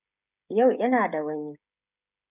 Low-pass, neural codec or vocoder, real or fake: 3.6 kHz; codec, 16 kHz, 16 kbps, FreqCodec, smaller model; fake